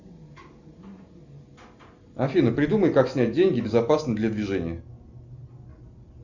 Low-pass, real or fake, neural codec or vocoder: 7.2 kHz; real; none